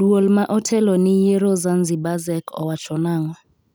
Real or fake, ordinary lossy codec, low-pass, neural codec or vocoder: real; none; none; none